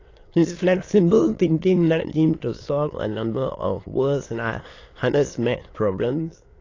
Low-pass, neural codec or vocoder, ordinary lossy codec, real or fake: 7.2 kHz; autoencoder, 22.05 kHz, a latent of 192 numbers a frame, VITS, trained on many speakers; AAC, 32 kbps; fake